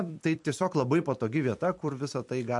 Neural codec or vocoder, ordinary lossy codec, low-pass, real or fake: vocoder, 44.1 kHz, 128 mel bands every 256 samples, BigVGAN v2; MP3, 64 kbps; 10.8 kHz; fake